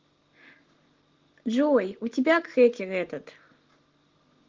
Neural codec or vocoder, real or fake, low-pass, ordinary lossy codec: none; real; 7.2 kHz; Opus, 16 kbps